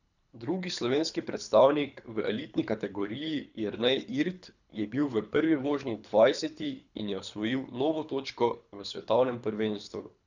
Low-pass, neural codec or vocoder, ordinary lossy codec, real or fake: 7.2 kHz; codec, 24 kHz, 3 kbps, HILCodec; none; fake